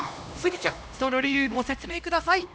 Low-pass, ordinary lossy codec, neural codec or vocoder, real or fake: none; none; codec, 16 kHz, 1 kbps, X-Codec, HuBERT features, trained on LibriSpeech; fake